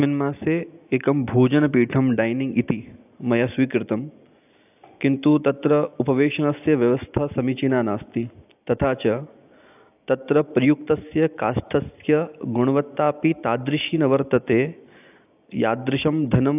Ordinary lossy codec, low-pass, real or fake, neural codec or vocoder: AAC, 32 kbps; 3.6 kHz; real; none